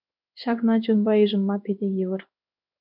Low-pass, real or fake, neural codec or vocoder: 5.4 kHz; fake; codec, 16 kHz in and 24 kHz out, 1 kbps, XY-Tokenizer